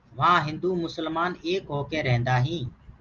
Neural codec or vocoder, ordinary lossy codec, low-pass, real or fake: none; Opus, 32 kbps; 7.2 kHz; real